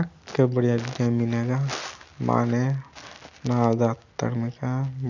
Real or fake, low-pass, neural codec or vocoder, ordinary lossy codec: real; 7.2 kHz; none; none